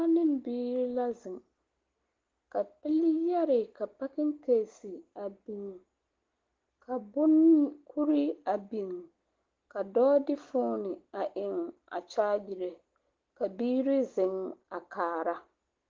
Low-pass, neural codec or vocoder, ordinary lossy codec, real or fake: 7.2 kHz; none; Opus, 16 kbps; real